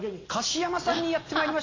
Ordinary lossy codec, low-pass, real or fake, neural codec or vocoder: AAC, 32 kbps; 7.2 kHz; real; none